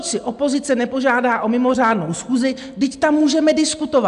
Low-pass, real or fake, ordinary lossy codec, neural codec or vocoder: 10.8 kHz; real; AAC, 96 kbps; none